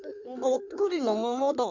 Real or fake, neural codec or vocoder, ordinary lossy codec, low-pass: fake; codec, 16 kHz in and 24 kHz out, 1.1 kbps, FireRedTTS-2 codec; none; 7.2 kHz